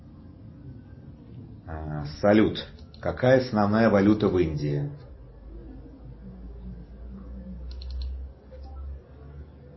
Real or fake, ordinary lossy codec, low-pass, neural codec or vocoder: real; MP3, 24 kbps; 7.2 kHz; none